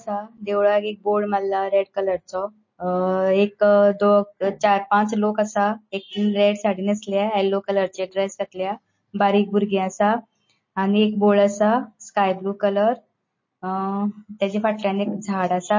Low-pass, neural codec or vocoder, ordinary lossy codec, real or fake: 7.2 kHz; none; MP3, 32 kbps; real